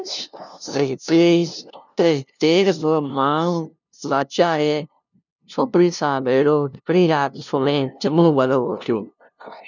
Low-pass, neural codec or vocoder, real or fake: 7.2 kHz; codec, 16 kHz, 0.5 kbps, FunCodec, trained on LibriTTS, 25 frames a second; fake